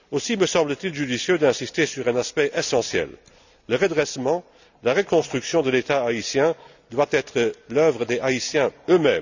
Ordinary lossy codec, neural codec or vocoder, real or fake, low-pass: none; none; real; 7.2 kHz